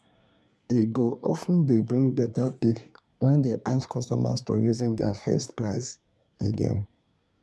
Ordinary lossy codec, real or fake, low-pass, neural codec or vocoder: none; fake; none; codec, 24 kHz, 1 kbps, SNAC